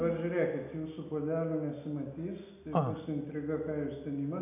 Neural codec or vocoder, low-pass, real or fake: none; 3.6 kHz; real